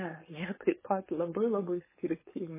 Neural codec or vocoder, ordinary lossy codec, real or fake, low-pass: codec, 16 kHz, 4.8 kbps, FACodec; MP3, 16 kbps; fake; 3.6 kHz